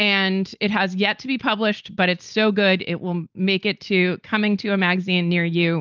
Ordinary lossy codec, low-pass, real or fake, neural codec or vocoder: Opus, 24 kbps; 7.2 kHz; real; none